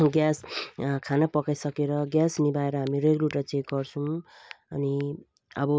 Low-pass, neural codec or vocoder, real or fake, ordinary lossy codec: none; none; real; none